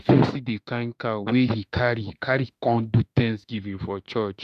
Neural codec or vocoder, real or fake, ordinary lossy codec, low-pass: autoencoder, 48 kHz, 32 numbers a frame, DAC-VAE, trained on Japanese speech; fake; none; 14.4 kHz